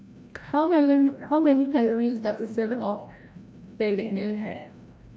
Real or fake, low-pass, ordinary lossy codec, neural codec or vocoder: fake; none; none; codec, 16 kHz, 0.5 kbps, FreqCodec, larger model